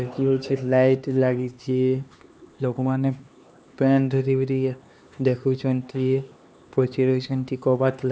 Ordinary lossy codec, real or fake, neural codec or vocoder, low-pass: none; fake; codec, 16 kHz, 2 kbps, X-Codec, HuBERT features, trained on LibriSpeech; none